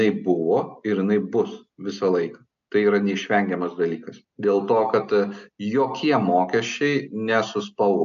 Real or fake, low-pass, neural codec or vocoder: real; 7.2 kHz; none